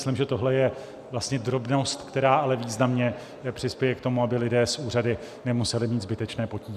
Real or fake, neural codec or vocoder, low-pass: real; none; 14.4 kHz